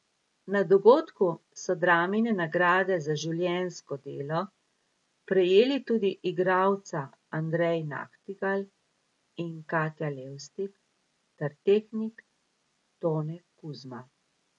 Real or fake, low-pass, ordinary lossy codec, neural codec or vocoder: real; 10.8 kHz; MP3, 48 kbps; none